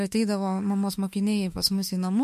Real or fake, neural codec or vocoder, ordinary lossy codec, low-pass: fake; autoencoder, 48 kHz, 32 numbers a frame, DAC-VAE, trained on Japanese speech; MP3, 64 kbps; 14.4 kHz